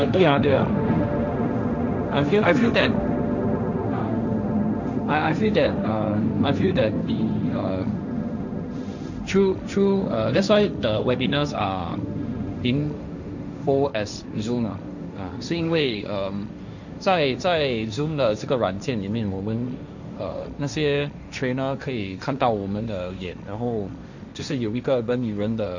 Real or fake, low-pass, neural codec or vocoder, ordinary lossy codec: fake; 7.2 kHz; codec, 16 kHz, 1.1 kbps, Voila-Tokenizer; none